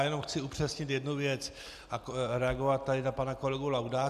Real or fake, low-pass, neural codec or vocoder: real; 14.4 kHz; none